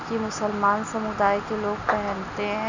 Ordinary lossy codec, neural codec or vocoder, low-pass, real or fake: none; none; 7.2 kHz; real